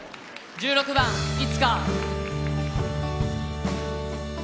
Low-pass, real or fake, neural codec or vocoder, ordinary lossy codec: none; real; none; none